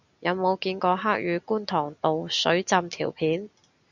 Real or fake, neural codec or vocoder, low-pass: real; none; 7.2 kHz